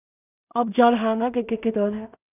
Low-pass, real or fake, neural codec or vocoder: 3.6 kHz; fake; codec, 16 kHz in and 24 kHz out, 0.4 kbps, LongCat-Audio-Codec, two codebook decoder